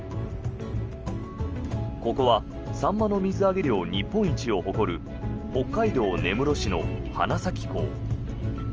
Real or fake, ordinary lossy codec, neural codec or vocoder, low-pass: real; Opus, 24 kbps; none; 7.2 kHz